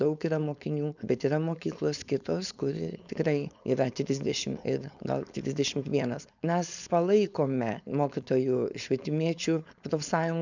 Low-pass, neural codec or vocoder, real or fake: 7.2 kHz; codec, 16 kHz, 4.8 kbps, FACodec; fake